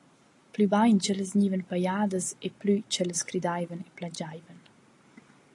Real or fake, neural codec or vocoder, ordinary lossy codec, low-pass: real; none; AAC, 48 kbps; 10.8 kHz